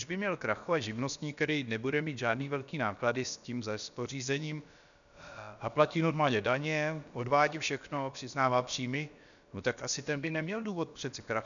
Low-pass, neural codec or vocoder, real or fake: 7.2 kHz; codec, 16 kHz, about 1 kbps, DyCAST, with the encoder's durations; fake